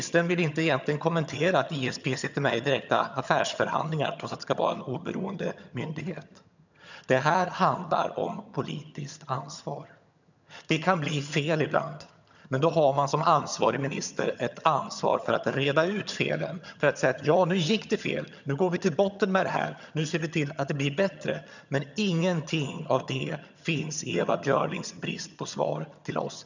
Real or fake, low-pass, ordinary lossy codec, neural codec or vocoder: fake; 7.2 kHz; none; vocoder, 22.05 kHz, 80 mel bands, HiFi-GAN